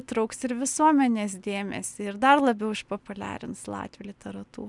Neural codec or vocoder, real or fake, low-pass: none; real; 10.8 kHz